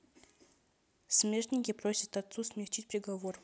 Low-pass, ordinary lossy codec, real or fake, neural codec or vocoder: none; none; real; none